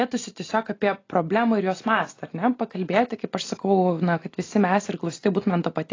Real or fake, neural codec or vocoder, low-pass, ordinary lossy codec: real; none; 7.2 kHz; AAC, 32 kbps